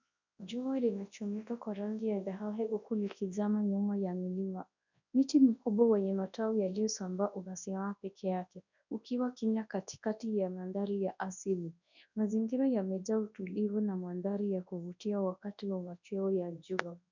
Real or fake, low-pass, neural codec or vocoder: fake; 7.2 kHz; codec, 24 kHz, 0.9 kbps, WavTokenizer, large speech release